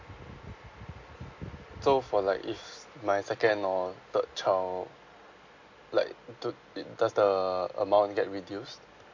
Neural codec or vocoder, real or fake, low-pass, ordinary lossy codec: none; real; 7.2 kHz; AAC, 48 kbps